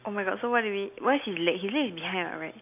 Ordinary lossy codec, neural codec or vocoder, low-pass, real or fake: AAC, 32 kbps; none; 3.6 kHz; real